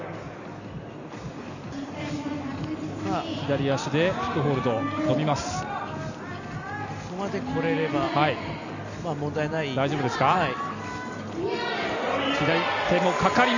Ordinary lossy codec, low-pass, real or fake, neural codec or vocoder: none; 7.2 kHz; real; none